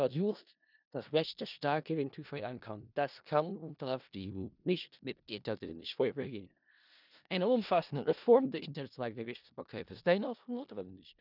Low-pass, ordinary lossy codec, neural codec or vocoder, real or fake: 5.4 kHz; none; codec, 16 kHz in and 24 kHz out, 0.4 kbps, LongCat-Audio-Codec, four codebook decoder; fake